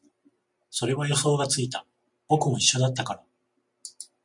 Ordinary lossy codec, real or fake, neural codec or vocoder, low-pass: MP3, 64 kbps; real; none; 10.8 kHz